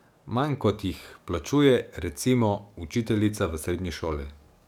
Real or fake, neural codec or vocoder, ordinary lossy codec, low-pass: fake; codec, 44.1 kHz, 7.8 kbps, DAC; none; 19.8 kHz